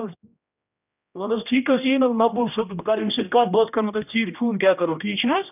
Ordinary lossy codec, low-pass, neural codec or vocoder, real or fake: none; 3.6 kHz; codec, 16 kHz, 1 kbps, X-Codec, HuBERT features, trained on general audio; fake